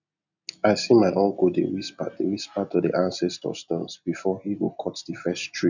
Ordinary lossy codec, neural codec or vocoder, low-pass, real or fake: none; none; 7.2 kHz; real